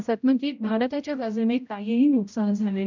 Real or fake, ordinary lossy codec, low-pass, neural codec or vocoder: fake; none; 7.2 kHz; codec, 16 kHz, 0.5 kbps, X-Codec, HuBERT features, trained on general audio